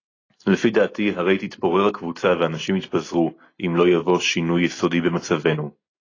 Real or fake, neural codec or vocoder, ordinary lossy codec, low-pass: real; none; AAC, 32 kbps; 7.2 kHz